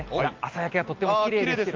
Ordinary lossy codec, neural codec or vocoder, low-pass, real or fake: Opus, 32 kbps; none; 7.2 kHz; real